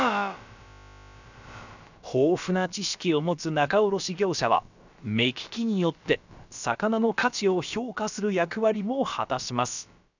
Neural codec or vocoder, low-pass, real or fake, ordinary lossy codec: codec, 16 kHz, about 1 kbps, DyCAST, with the encoder's durations; 7.2 kHz; fake; none